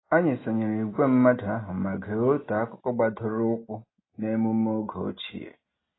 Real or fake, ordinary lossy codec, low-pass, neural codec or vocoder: real; AAC, 16 kbps; 7.2 kHz; none